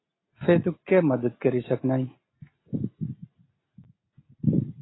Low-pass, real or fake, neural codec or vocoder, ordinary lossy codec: 7.2 kHz; real; none; AAC, 16 kbps